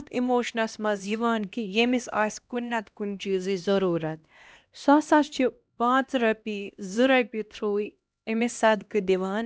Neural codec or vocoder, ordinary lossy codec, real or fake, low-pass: codec, 16 kHz, 1 kbps, X-Codec, HuBERT features, trained on LibriSpeech; none; fake; none